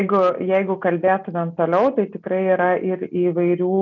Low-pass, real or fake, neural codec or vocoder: 7.2 kHz; real; none